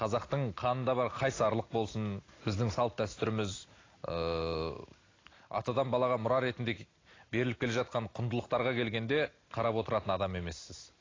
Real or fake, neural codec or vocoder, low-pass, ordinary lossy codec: real; none; 7.2 kHz; AAC, 32 kbps